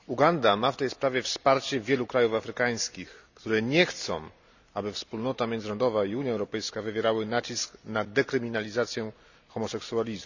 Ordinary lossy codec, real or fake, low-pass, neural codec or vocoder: none; real; 7.2 kHz; none